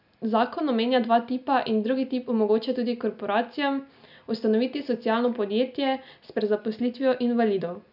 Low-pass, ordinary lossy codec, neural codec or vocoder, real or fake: 5.4 kHz; none; none; real